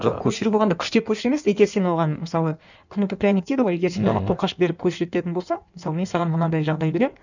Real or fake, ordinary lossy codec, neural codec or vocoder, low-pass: fake; none; codec, 16 kHz in and 24 kHz out, 1.1 kbps, FireRedTTS-2 codec; 7.2 kHz